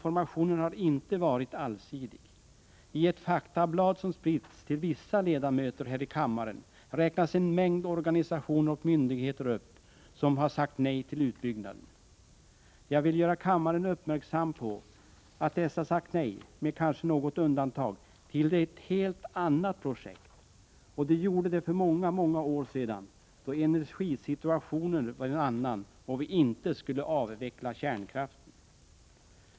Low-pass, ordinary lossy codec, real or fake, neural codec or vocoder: none; none; real; none